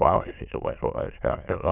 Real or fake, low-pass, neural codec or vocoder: fake; 3.6 kHz; autoencoder, 22.05 kHz, a latent of 192 numbers a frame, VITS, trained on many speakers